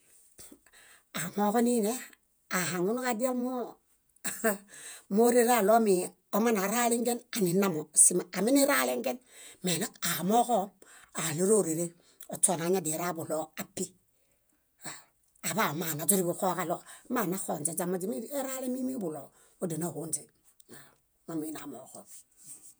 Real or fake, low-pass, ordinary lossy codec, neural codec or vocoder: fake; none; none; vocoder, 48 kHz, 128 mel bands, Vocos